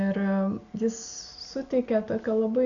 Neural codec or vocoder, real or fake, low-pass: none; real; 7.2 kHz